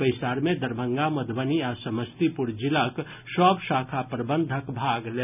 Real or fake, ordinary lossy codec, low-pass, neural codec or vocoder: real; none; 3.6 kHz; none